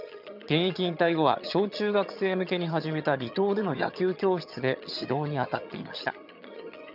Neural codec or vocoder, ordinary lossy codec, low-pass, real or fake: vocoder, 22.05 kHz, 80 mel bands, HiFi-GAN; none; 5.4 kHz; fake